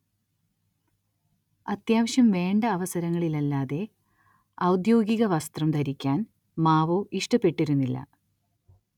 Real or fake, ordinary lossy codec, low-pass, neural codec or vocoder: real; none; 19.8 kHz; none